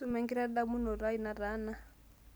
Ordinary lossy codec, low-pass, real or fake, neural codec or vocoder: none; none; real; none